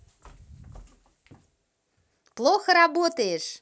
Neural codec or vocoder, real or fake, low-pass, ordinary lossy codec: none; real; none; none